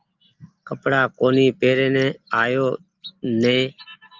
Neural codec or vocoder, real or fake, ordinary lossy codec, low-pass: none; real; Opus, 24 kbps; 7.2 kHz